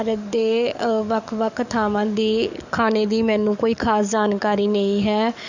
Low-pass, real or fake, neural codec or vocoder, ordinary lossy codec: 7.2 kHz; fake; codec, 44.1 kHz, 7.8 kbps, DAC; none